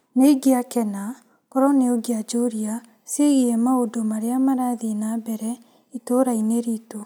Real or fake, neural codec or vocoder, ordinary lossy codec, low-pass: real; none; none; none